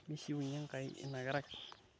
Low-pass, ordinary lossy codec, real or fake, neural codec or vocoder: none; none; real; none